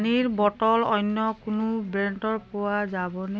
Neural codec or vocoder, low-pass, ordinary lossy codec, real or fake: none; none; none; real